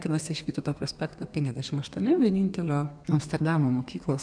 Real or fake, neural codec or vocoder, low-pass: fake; codec, 32 kHz, 1.9 kbps, SNAC; 9.9 kHz